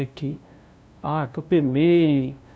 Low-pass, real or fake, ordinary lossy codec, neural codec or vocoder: none; fake; none; codec, 16 kHz, 0.5 kbps, FunCodec, trained on LibriTTS, 25 frames a second